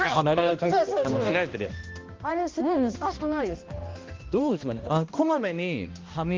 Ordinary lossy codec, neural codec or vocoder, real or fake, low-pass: Opus, 32 kbps; codec, 16 kHz, 1 kbps, X-Codec, HuBERT features, trained on balanced general audio; fake; 7.2 kHz